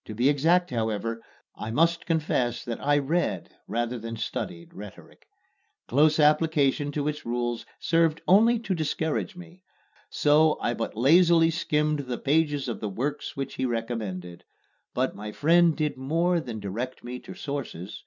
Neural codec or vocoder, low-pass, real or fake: none; 7.2 kHz; real